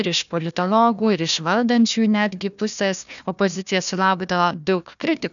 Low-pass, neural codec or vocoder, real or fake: 7.2 kHz; codec, 16 kHz, 1 kbps, FunCodec, trained on Chinese and English, 50 frames a second; fake